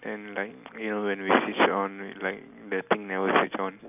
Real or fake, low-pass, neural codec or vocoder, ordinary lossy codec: real; 3.6 kHz; none; none